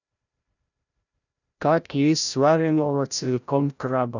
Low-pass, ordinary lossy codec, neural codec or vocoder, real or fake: 7.2 kHz; none; codec, 16 kHz, 0.5 kbps, FreqCodec, larger model; fake